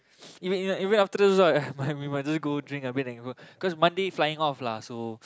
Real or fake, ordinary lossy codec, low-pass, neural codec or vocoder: real; none; none; none